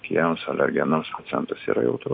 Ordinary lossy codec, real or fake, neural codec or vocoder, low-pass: MP3, 32 kbps; real; none; 5.4 kHz